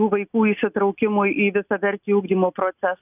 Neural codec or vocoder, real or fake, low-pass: none; real; 3.6 kHz